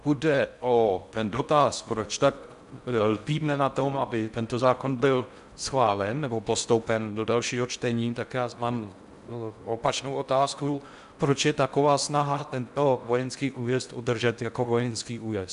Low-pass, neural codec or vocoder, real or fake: 10.8 kHz; codec, 16 kHz in and 24 kHz out, 0.6 kbps, FocalCodec, streaming, 4096 codes; fake